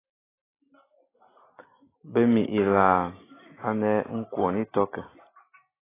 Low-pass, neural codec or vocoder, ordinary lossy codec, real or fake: 3.6 kHz; none; AAC, 16 kbps; real